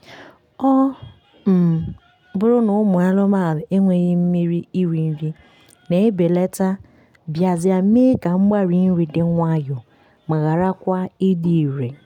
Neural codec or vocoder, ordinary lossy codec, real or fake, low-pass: none; none; real; 19.8 kHz